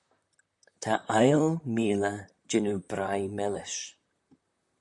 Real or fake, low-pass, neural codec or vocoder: fake; 10.8 kHz; vocoder, 44.1 kHz, 128 mel bands, Pupu-Vocoder